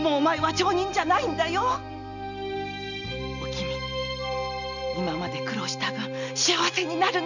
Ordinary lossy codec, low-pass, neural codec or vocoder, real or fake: none; 7.2 kHz; none; real